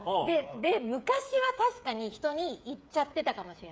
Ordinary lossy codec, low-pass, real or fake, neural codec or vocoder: none; none; fake; codec, 16 kHz, 8 kbps, FreqCodec, smaller model